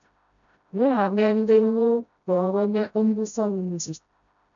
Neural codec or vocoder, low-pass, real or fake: codec, 16 kHz, 0.5 kbps, FreqCodec, smaller model; 7.2 kHz; fake